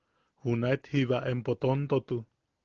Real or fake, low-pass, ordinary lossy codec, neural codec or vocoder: real; 7.2 kHz; Opus, 16 kbps; none